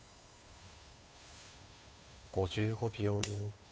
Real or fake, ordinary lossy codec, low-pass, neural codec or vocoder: fake; none; none; codec, 16 kHz, 2 kbps, FunCodec, trained on Chinese and English, 25 frames a second